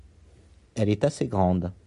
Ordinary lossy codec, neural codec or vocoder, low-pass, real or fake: MP3, 64 kbps; none; 10.8 kHz; real